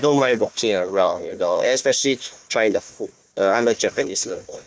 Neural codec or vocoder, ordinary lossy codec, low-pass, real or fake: codec, 16 kHz, 1 kbps, FunCodec, trained on Chinese and English, 50 frames a second; none; none; fake